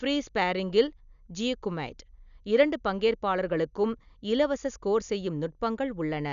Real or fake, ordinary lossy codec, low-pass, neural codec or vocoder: real; none; 7.2 kHz; none